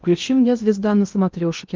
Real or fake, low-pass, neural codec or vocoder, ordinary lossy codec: fake; 7.2 kHz; codec, 16 kHz in and 24 kHz out, 0.8 kbps, FocalCodec, streaming, 65536 codes; Opus, 32 kbps